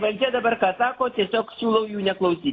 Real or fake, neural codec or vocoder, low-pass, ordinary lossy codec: real; none; 7.2 kHz; AAC, 32 kbps